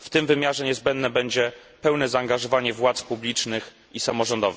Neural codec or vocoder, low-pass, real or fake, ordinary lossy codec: none; none; real; none